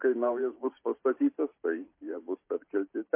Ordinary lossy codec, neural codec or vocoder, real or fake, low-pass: MP3, 24 kbps; vocoder, 44.1 kHz, 128 mel bands every 512 samples, BigVGAN v2; fake; 3.6 kHz